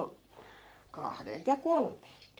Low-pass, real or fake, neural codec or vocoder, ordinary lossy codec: none; fake; codec, 44.1 kHz, 3.4 kbps, Pupu-Codec; none